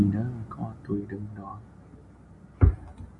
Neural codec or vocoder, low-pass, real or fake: none; 10.8 kHz; real